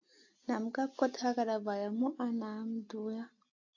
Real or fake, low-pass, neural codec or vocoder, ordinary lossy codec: real; 7.2 kHz; none; AAC, 48 kbps